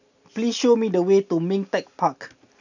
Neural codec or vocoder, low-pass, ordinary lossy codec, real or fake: none; 7.2 kHz; none; real